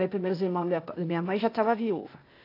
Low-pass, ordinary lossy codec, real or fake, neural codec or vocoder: 5.4 kHz; AAC, 32 kbps; fake; codec, 16 kHz, 0.8 kbps, ZipCodec